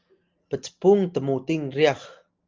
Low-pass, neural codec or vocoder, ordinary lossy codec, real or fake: 7.2 kHz; none; Opus, 32 kbps; real